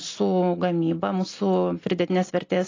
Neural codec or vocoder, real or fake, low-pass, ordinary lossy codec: none; real; 7.2 kHz; AAC, 32 kbps